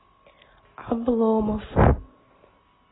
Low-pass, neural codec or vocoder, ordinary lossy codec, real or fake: 7.2 kHz; none; AAC, 16 kbps; real